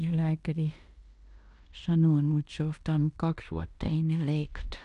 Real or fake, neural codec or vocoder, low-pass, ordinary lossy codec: fake; codec, 16 kHz in and 24 kHz out, 0.9 kbps, LongCat-Audio-Codec, fine tuned four codebook decoder; 10.8 kHz; none